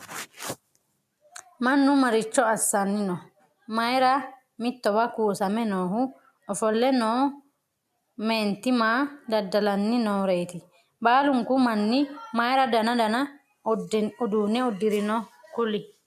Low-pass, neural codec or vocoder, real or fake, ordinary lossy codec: 14.4 kHz; none; real; AAC, 96 kbps